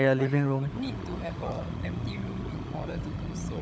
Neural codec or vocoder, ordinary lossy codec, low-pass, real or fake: codec, 16 kHz, 16 kbps, FunCodec, trained on LibriTTS, 50 frames a second; none; none; fake